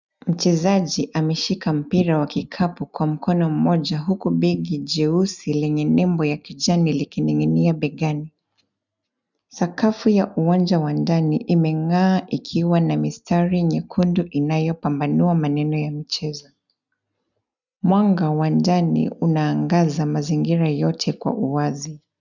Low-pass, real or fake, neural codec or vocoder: 7.2 kHz; real; none